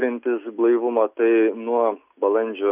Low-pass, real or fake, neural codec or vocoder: 3.6 kHz; real; none